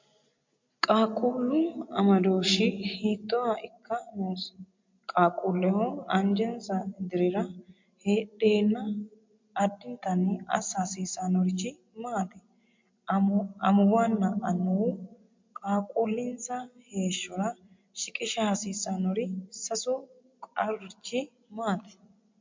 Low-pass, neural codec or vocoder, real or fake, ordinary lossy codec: 7.2 kHz; none; real; MP3, 48 kbps